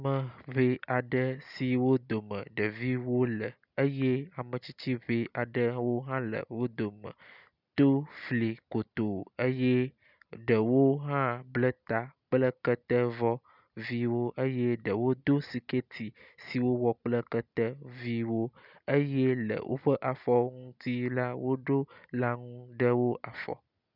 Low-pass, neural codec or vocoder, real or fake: 5.4 kHz; none; real